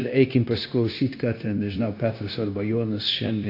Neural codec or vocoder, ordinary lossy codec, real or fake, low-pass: codec, 16 kHz, 0.9 kbps, LongCat-Audio-Codec; AAC, 32 kbps; fake; 5.4 kHz